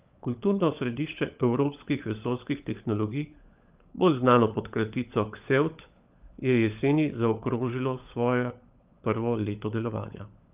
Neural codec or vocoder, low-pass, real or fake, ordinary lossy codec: codec, 16 kHz, 16 kbps, FunCodec, trained on LibriTTS, 50 frames a second; 3.6 kHz; fake; Opus, 24 kbps